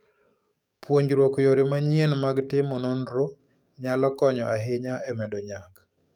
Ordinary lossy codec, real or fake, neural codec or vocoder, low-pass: none; fake; codec, 44.1 kHz, 7.8 kbps, DAC; 19.8 kHz